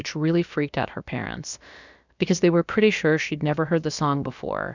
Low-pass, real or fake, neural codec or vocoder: 7.2 kHz; fake; codec, 16 kHz, about 1 kbps, DyCAST, with the encoder's durations